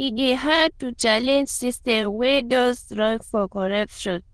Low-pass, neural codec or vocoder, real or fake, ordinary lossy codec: 9.9 kHz; autoencoder, 22.05 kHz, a latent of 192 numbers a frame, VITS, trained on many speakers; fake; Opus, 16 kbps